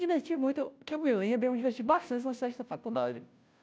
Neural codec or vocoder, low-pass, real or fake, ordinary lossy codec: codec, 16 kHz, 0.5 kbps, FunCodec, trained on Chinese and English, 25 frames a second; none; fake; none